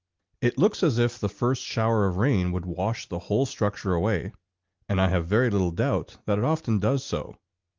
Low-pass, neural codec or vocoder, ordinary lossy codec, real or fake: 7.2 kHz; none; Opus, 32 kbps; real